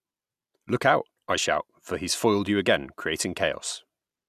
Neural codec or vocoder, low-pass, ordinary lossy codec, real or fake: none; 14.4 kHz; none; real